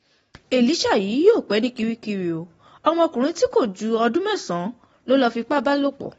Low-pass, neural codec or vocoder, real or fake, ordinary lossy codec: 19.8 kHz; none; real; AAC, 24 kbps